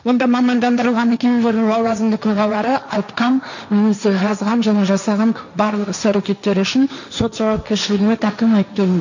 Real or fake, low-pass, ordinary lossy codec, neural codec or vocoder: fake; 7.2 kHz; none; codec, 16 kHz, 1.1 kbps, Voila-Tokenizer